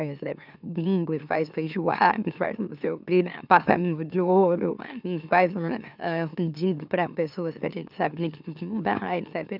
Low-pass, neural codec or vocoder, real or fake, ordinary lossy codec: 5.4 kHz; autoencoder, 44.1 kHz, a latent of 192 numbers a frame, MeloTTS; fake; none